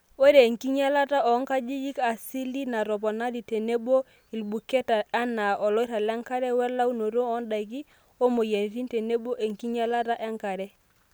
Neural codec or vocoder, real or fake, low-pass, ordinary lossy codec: none; real; none; none